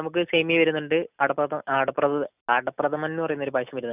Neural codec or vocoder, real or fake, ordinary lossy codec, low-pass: none; real; none; 3.6 kHz